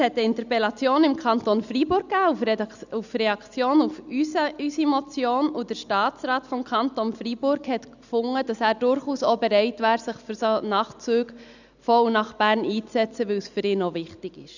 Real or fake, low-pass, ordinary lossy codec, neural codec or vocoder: real; 7.2 kHz; none; none